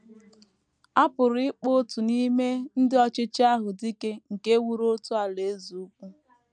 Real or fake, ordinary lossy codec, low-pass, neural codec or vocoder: real; none; 9.9 kHz; none